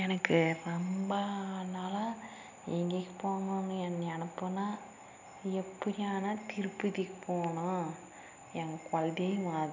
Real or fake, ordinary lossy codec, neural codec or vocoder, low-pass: real; none; none; 7.2 kHz